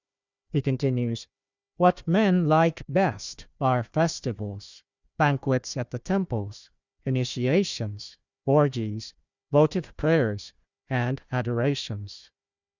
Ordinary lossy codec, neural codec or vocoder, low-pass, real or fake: Opus, 64 kbps; codec, 16 kHz, 1 kbps, FunCodec, trained on Chinese and English, 50 frames a second; 7.2 kHz; fake